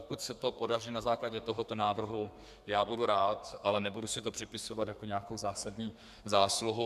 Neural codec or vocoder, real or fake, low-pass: codec, 32 kHz, 1.9 kbps, SNAC; fake; 14.4 kHz